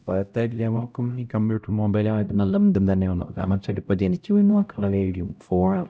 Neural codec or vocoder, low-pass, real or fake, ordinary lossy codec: codec, 16 kHz, 0.5 kbps, X-Codec, HuBERT features, trained on LibriSpeech; none; fake; none